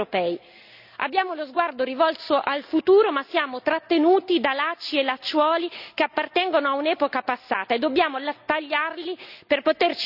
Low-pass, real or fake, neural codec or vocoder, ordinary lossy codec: 5.4 kHz; real; none; none